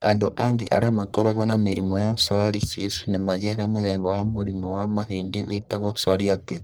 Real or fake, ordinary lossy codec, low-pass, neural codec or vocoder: fake; none; none; codec, 44.1 kHz, 1.7 kbps, Pupu-Codec